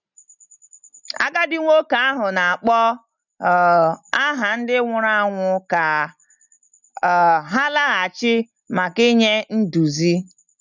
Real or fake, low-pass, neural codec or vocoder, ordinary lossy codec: real; 7.2 kHz; none; none